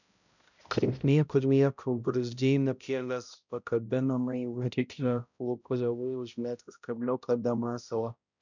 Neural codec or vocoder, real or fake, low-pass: codec, 16 kHz, 0.5 kbps, X-Codec, HuBERT features, trained on balanced general audio; fake; 7.2 kHz